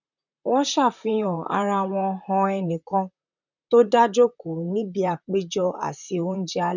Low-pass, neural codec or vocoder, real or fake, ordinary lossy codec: 7.2 kHz; vocoder, 44.1 kHz, 128 mel bands, Pupu-Vocoder; fake; none